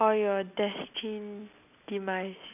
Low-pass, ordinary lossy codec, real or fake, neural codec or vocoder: 3.6 kHz; none; real; none